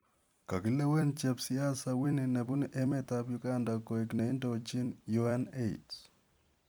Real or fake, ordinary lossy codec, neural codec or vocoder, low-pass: fake; none; vocoder, 44.1 kHz, 128 mel bands every 256 samples, BigVGAN v2; none